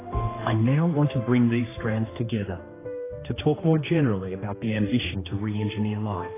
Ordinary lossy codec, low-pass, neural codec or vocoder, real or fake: AAC, 16 kbps; 3.6 kHz; codec, 16 kHz, 2 kbps, X-Codec, HuBERT features, trained on general audio; fake